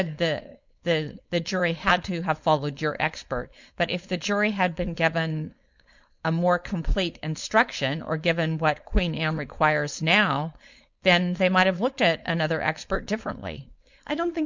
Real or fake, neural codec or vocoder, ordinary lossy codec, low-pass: fake; codec, 16 kHz, 4.8 kbps, FACodec; Opus, 64 kbps; 7.2 kHz